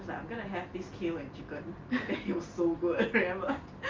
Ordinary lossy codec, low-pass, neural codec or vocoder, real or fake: Opus, 32 kbps; 7.2 kHz; none; real